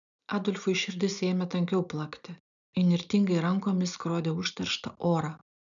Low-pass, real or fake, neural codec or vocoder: 7.2 kHz; real; none